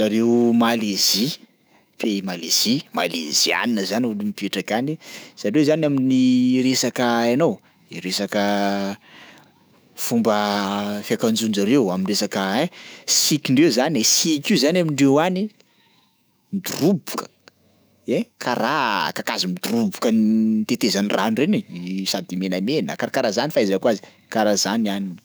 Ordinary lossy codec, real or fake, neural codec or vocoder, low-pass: none; fake; autoencoder, 48 kHz, 128 numbers a frame, DAC-VAE, trained on Japanese speech; none